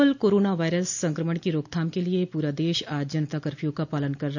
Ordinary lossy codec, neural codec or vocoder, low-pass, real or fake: none; none; 7.2 kHz; real